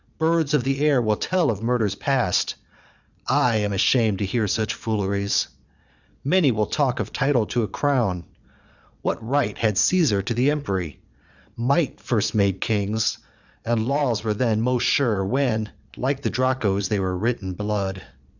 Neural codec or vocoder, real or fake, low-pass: vocoder, 22.05 kHz, 80 mel bands, Vocos; fake; 7.2 kHz